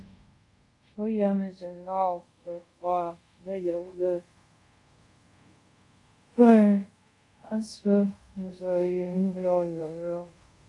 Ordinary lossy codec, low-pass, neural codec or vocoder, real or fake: AAC, 48 kbps; 10.8 kHz; codec, 24 kHz, 0.5 kbps, DualCodec; fake